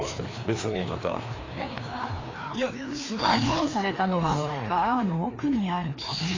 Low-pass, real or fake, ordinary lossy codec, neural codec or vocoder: 7.2 kHz; fake; AAC, 32 kbps; codec, 16 kHz, 2 kbps, FreqCodec, larger model